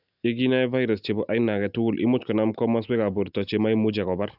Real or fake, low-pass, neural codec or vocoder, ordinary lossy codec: real; 5.4 kHz; none; none